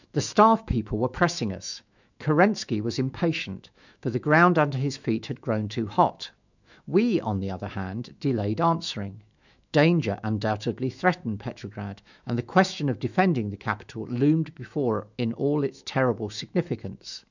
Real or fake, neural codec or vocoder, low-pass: fake; autoencoder, 48 kHz, 128 numbers a frame, DAC-VAE, trained on Japanese speech; 7.2 kHz